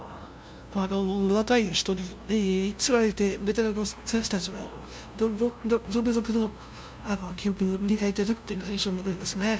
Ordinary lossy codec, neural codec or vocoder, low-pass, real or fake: none; codec, 16 kHz, 0.5 kbps, FunCodec, trained on LibriTTS, 25 frames a second; none; fake